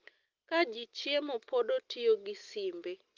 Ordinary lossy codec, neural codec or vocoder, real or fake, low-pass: Opus, 32 kbps; none; real; 7.2 kHz